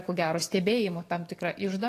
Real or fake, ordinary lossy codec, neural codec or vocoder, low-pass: fake; AAC, 48 kbps; codec, 44.1 kHz, 7.8 kbps, DAC; 14.4 kHz